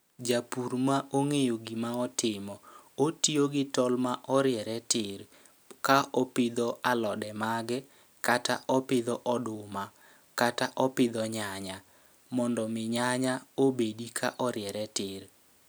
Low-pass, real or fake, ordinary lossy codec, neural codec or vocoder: none; real; none; none